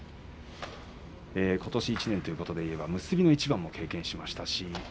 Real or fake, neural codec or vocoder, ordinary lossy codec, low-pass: real; none; none; none